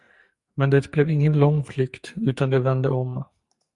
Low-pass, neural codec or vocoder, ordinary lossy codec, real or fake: 10.8 kHz; codec, 44.1 kHz, 2.6 kbps, SNAC; Opus, 64 kbps; fake